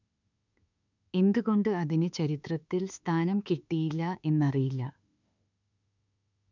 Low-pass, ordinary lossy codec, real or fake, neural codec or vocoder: 7.2 kHz; none; fake; autoencoder, 48 kHz, 32 numbers a frame, DAC-VAE, trained on Japanese speech